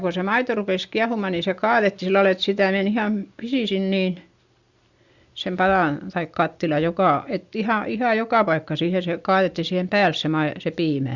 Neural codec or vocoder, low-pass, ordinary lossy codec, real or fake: none; 7.2 kHz; Opus, 64 kbps; real